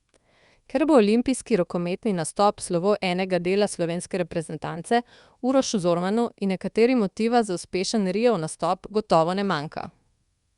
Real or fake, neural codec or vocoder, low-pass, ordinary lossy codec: fake; codec, 24 kHz, 1.2 kbps, DualCodec; 10.8 kHz; Opus, 64 kbps